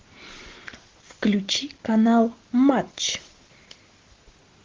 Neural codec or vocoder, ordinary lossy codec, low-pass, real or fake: none; Opus, 16 kbps; 7.2 kHz; real